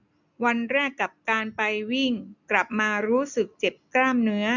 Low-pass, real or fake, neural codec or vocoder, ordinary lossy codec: 7.2 kHz; real; none; none